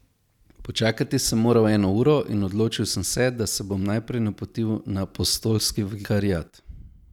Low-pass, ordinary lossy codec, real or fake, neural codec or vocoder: 19.8 kHz; none; real; none